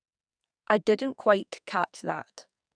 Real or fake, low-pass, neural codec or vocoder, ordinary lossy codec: fake; 9.9 kHz; codec, 44.1 kHz, 2.6 kbps, SNAC; none